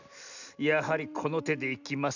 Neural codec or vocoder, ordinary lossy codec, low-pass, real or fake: none; none; 7.2 kHz; real